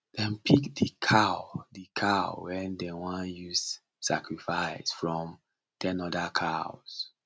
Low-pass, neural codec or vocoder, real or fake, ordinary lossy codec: none; none; real; none